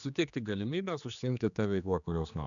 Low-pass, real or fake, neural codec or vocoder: 7.2 kHz; fake; codec, 16 kHz, 2 kbps, X-Codec, HuBERT features, trained on general audio